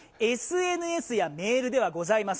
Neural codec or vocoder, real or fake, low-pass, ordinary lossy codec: none; real; none; none